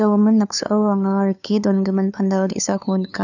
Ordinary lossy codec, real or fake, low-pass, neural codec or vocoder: none; fake; 7.2 kHz; codec, 16 kHz, 2 kbps, FunCodec, trained on LibriTTS, 25 frames a second